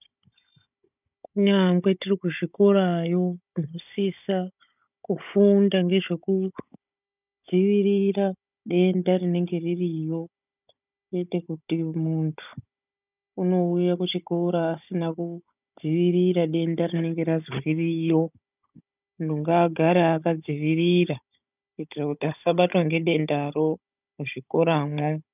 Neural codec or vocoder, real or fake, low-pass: codec, 16 kHz, 16 kbps, FunCodec, trained on Chinese and English, 50 frames a second; fake; 3.6 kHz